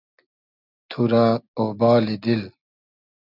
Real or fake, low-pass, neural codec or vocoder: real; 5.4 kHz; none